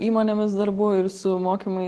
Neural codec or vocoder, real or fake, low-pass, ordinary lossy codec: none; real; 10.8 kHz; Opus, 16 kbps